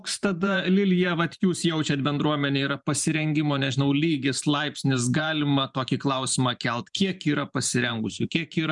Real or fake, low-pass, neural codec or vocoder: fake; 10.8 kHz; vocoder, 44.1 kHz, 128 mel bands every 512 samples, BigVGAN v2